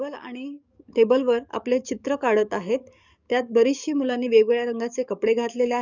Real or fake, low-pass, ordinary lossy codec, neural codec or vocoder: fake; 7.2 kHz; none; codec, 44.1 kHz, 7.8 kbps, DAC